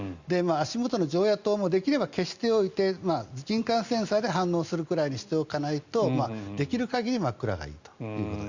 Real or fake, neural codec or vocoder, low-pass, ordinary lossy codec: real; none; 7.2 kHz; Opus, 64 kbps